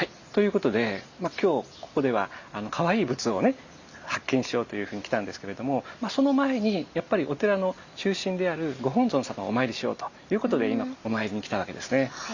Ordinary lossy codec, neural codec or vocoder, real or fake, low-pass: Opus, 64 kbps; none; real; 7.2 kHz